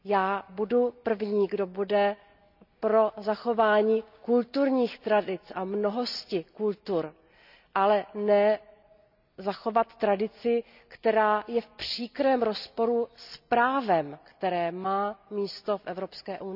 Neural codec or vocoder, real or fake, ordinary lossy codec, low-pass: none; real; none; 5.4 kHz